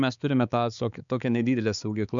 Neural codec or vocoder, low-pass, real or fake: codec, 16 kHz, 4 kbps, X-Codec, HuBERT features, trained on balanced general audio; 7.2 kHz; fake